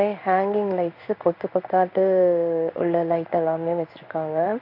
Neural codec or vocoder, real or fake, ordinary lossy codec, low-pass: codec, 16 kHz in and 24 kHz out, 1 kbps, XY-Tokenizer; fake; AAC, 48 kbps; 5.4 kHz